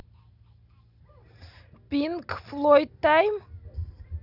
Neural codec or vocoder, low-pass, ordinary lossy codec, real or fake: none; 5.4 kHz; none; real